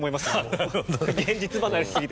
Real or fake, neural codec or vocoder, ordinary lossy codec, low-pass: real; none; none; none